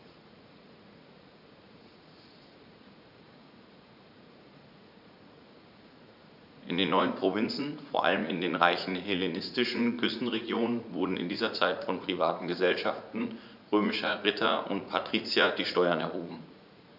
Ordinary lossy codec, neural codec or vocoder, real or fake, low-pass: none; vocoder, 44.1 kHz, 80 mel bands, Vocos; fake; 5.4 kHz